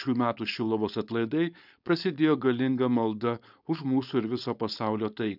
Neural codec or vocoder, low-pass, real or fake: codec, 16 kHz, 4.8 kbps, FACodec; 5.4 kHz; fake